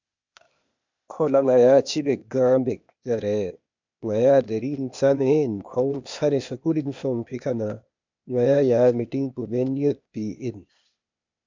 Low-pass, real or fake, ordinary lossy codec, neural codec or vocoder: 7.2 kHz; fake; MP3, 64 kbps; codec, 16 kHz, 0.8 kbps, ZipCodec